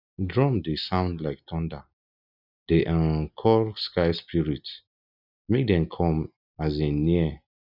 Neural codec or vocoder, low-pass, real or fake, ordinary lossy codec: none; 5.4 kHz; real; none